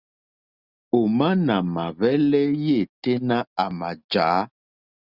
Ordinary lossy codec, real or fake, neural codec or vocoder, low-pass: Opus, 64 kbps; real; none; 5.4 kHz